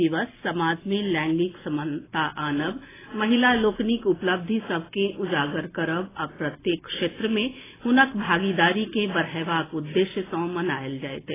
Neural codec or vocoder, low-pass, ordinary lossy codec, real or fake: none; 3.6 kHz; AAC, 16 kbps; real